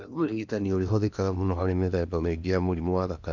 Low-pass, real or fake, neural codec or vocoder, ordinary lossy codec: 7.2 kHz; fake; codec, 16 kHz in and 24 kHz out, 0.8 kbps, FocalCodec, streaming, 65536 codes; none